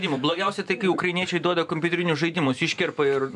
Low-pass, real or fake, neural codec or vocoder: 10.8 kHz; fake; vocoder, 44.1 kHz, 128 mel bands every 512 samples, BigVGAN v2